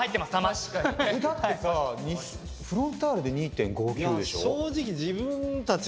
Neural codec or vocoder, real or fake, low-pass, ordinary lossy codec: none; real; none; none